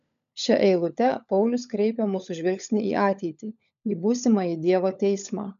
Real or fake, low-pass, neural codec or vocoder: fake; 7.2 kHz; codec, 16 kHz, 16 kbps, FunCodec, trained on LibriTTS, 50 frames a second